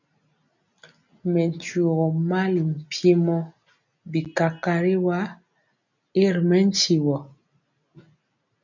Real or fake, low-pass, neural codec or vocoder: real; 7.2 kHz; none